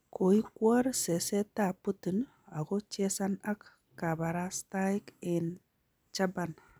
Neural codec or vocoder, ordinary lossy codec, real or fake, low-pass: none; none; real; none